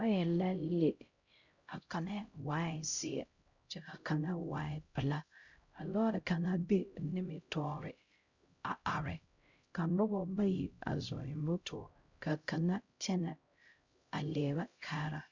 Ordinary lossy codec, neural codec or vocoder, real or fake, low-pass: Opus, 64 kbps; codec, 16 kHz, 0.5 kbps, X-Codec, HuBERT features, trained on LibriSpeech; fake; 7.2 kHz